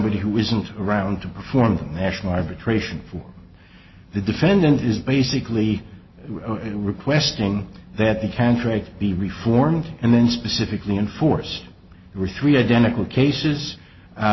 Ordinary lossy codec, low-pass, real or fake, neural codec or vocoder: MP3, 24 kbps; 7.2 kHz; real; none